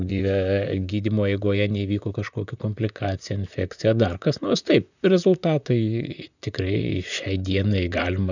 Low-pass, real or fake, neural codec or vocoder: 7.2 kHz; fake; vocoder, 44.1 kHz, 128 mel bands, Pupu-Vocoder